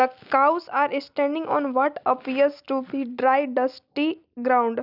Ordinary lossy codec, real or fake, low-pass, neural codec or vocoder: none; real; 5.4 kHz; none